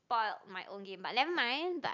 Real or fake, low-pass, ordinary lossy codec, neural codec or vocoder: real; 7.2 kHz; none; none